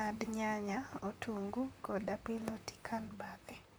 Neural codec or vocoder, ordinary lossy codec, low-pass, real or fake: vocoder, 44.1 kHz, 128 mel bands, Pupu-Vocoder; none; none; fake